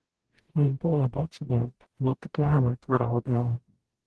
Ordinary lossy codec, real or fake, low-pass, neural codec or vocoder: Opus, 16 kbps; fake; 10.8 kHz; codec, 44.1 kHz, 0.9 kbps, DAC